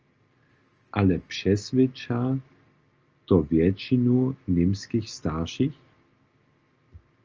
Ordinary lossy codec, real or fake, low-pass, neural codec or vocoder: Opus, 24 kbps; real; 7.2 kHz; none